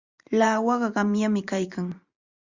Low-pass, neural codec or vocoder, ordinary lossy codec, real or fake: 7.2 kHz; vocoder, 44.1 kHz, 128 mel bands every 512 samples, BigVGAN v2; Opus, 64 kbps; fake